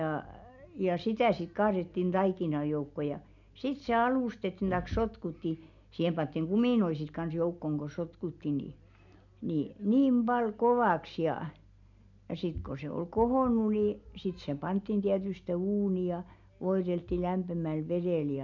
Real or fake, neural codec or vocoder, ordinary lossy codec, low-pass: real; none; none; 7.2 kHz